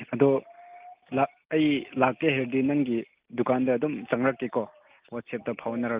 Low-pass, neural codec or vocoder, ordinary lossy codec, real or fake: 3.6 kHz; none; Opus, 32 kbps; real